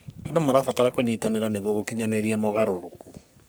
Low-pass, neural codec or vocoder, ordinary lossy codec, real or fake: none; codec, 44.1 kHz, 3.4 kbps, Pupu-Codec; none; fake